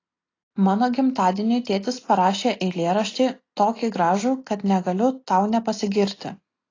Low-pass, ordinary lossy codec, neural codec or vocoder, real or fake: 7.2 kHz; AAC, 32 kbps; none; real